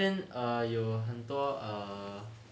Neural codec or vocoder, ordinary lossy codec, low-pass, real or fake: none; none; none; real